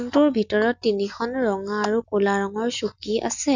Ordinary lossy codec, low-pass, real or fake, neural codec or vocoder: none; 7.2 kHz; real; none